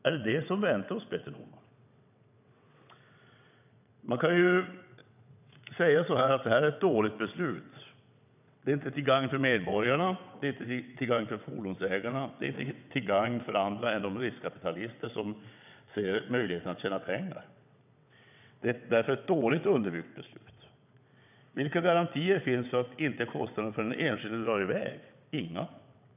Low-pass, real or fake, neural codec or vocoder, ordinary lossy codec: 3.6 kHz; fake; vocoder, 22.05 kHz, 80 mel bands, WaveNeXt; none